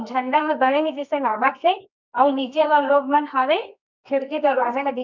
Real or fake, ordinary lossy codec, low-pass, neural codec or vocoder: fake; MP3, 64 kbps; 7.2 kHz; codec, 24 kHz, 0.9 kbps, WavTokenizer, medium music audio release